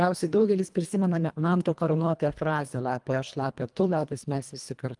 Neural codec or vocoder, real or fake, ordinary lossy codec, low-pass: codec, 24 kHz, 1.5 kbps, HILCodec; fake; Opus, 32 kbps; 10.8 kHz